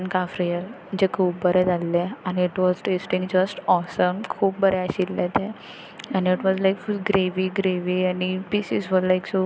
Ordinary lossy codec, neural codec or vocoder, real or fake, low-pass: none; none; real; none